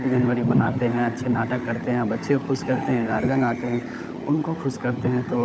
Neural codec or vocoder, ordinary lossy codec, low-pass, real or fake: codec, 16 kHz, 16 kbps, FunCodec, trained on LibriTTS, 50 frames a second; none; none; fake